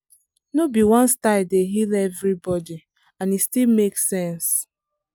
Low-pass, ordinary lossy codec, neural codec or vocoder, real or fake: none; none; none; real